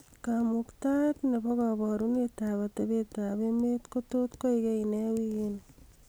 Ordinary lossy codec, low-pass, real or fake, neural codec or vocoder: none; none; real; none